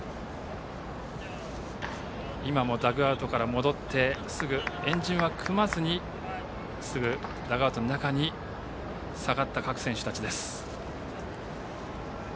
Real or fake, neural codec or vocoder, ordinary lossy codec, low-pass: real; none; none; none